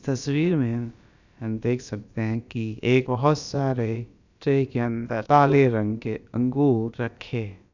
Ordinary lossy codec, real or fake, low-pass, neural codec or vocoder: none; fake; 7.2 kHz; codec, 16 kHz, about 1 kbps, DyCAST, with the encoder's durations